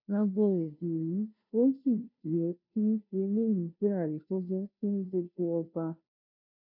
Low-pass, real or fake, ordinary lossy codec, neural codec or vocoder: 5.4 kHz; fake; none; codec, 16 kHz, 0.5 kbps, FunCodec, trained on Chinese and English, 25 frames a second